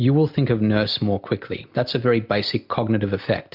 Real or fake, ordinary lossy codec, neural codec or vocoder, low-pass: real; MP3, 48 kbps; none; 5.4 kHz